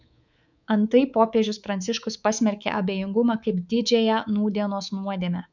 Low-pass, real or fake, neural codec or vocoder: 7.2 kHz; fake; codec, 24 kHz, 3.1 kbps, DualCodec